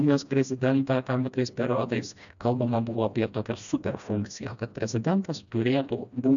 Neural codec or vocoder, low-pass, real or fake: codec, 16 kHz, 1 kbps, FreqCodec, smaller model; 7.2 kHz; fake